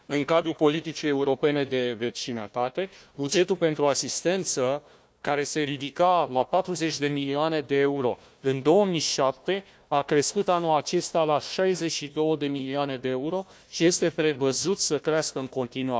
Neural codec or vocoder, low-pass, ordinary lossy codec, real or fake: codec, 16 kHz, 1 kbps, FunCodec, trained on Chinese and English, 50 frames a second; none; none; fake